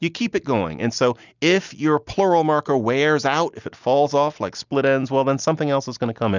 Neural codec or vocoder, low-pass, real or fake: none; 7.2 kHz; real